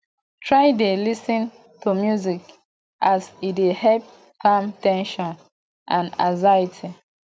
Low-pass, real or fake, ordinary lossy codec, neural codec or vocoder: none; real; none; none